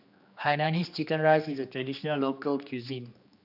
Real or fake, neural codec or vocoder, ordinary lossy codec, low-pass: fake; codec, 16 kHz, 2 kbps, X-Codec, HuBERT features, trained on general audio; none; 5.4 kHz